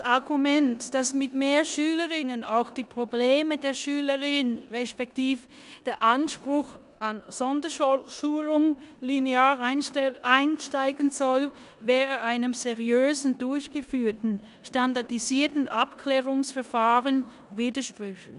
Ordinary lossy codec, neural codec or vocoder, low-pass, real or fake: none; codec, 16 kHz in and 24 kHz out, 0.9 kbps, LongCat-Audio-Codec, four codebook decoder; 10.8 kHz; fake